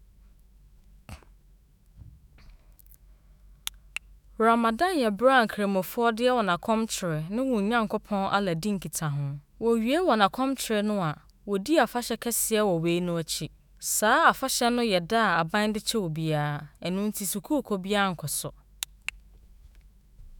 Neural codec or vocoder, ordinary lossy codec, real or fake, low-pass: autoencoder, 48 kHz, 128 numbers a frame, DAC-VAE, trained on Japanese speech; none; fake; none